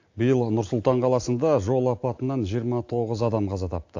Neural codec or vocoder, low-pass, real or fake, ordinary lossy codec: none; 7.2 kHz; real; AAC, 48 kbps